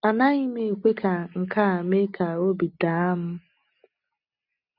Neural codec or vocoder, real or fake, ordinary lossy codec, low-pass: none; real; none; 5.4 kHz